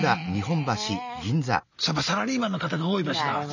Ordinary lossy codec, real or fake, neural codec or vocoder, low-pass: none; real; none; 7.2 kHz